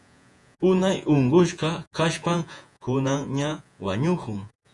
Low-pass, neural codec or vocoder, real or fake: 10.8 kHz; vocoder, 48 kHz, 128 mel bands, Vocos; fake